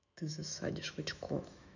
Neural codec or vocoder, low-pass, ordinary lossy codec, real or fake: autoencoder, 48 kHz, 128 numbers a frame, DAC-VAE, trained on Japanese speech; 7.2 kHz; none; fake